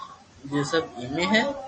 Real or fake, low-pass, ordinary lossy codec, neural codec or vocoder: real; 9.9 kHz; MP3, 32 kbps; none